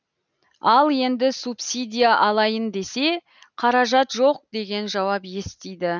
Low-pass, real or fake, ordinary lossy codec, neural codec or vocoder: 7.2 kHz; real; none; none